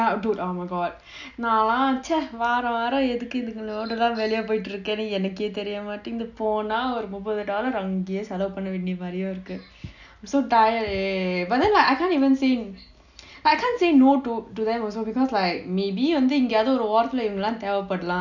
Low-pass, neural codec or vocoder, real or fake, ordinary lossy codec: 7.2 kHz; none; real; none